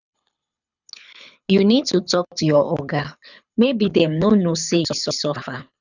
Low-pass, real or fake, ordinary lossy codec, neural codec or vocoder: 7.2 kHz; fake; none; codec, 24 kHz, 6 kbps, HILCodec